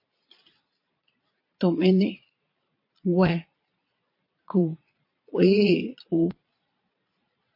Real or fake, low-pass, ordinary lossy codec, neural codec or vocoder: fake; 5.4 kHz; MP3, 32 kbps; vocoder, 22.05 kHz, 80 mel bands, Vocos